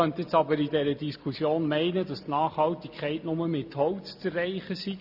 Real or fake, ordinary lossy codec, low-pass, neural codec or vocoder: real; AAC, 48 kbps; 5.4 kHz; none